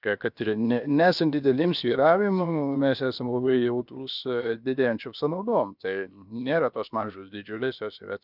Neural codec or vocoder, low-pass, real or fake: codec, 16 kHz, 0.7 kbps, FocalCodec; 5.4 kHz; fake